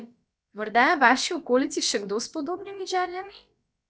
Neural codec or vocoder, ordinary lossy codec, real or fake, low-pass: codec, 16 kHz, about 1 kbps, DyCAST, with the encoder's durations; none; fake; none